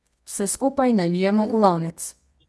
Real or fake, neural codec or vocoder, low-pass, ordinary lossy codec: fake; codec, 24 kHz, 0.9 kbps, WavTokenizer, medium music audio release; none; none